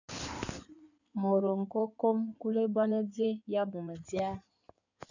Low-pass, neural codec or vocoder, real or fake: 7.2 kHz; codec, 16 kHz in and 24 kHz out, 2.2 kbps, FireRedTTS-2 codec; fake